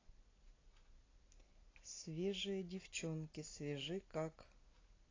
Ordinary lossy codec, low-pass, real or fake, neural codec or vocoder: AAC, 32 kbps; 7.2 kHz; real; none